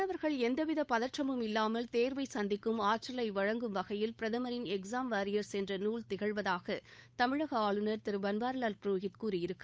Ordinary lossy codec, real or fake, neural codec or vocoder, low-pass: none; fake; codec, 16 kHz, 8 kbps, FunCodec, trained on Chinese and English, 25 frames a second; none